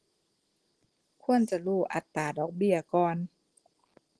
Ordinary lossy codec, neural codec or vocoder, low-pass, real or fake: Opus, 16 kbps; none; 10.8 kHz; real